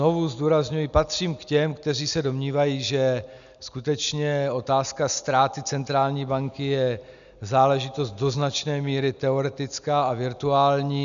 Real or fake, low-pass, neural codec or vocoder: real; 7.2 kHz; none